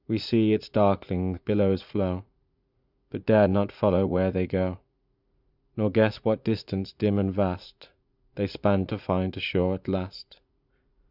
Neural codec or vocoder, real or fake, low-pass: vocoder, 44.1 kHz, 80 mel bands, Vocos; fake; 5.4 kHz